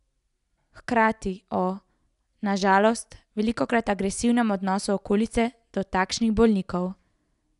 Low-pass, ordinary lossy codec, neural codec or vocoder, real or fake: 10.8 kHz; none; none; real